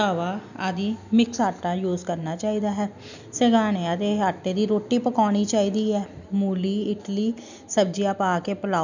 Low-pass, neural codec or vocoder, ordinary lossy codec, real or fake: 7.2 kHz; none; none; real